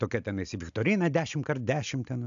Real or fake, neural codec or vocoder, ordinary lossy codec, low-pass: real; none; AAC, 64 kbps; 7.2 kHz